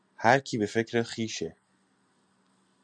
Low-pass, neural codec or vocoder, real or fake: 9.9 kHz; none; real